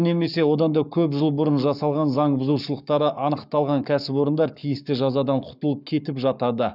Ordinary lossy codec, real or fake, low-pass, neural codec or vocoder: none; fake; 5.4 kHz; codec, 44.1 kHz, 7.8 kbps, Pupu-Codec